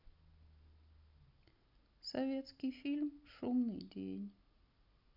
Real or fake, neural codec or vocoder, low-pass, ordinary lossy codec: real; none; 5.4 kHz; none